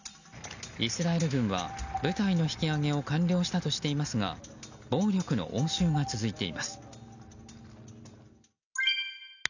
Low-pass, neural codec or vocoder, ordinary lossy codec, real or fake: 7.2 kHz; none; none; real